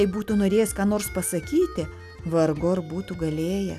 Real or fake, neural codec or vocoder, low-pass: real; none; 14.4 kHz